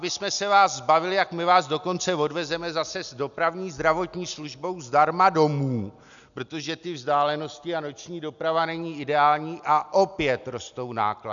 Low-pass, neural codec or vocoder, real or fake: 7.2 kHz; none; real